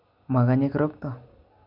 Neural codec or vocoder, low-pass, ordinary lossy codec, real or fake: none; 5.4 kHz; none; real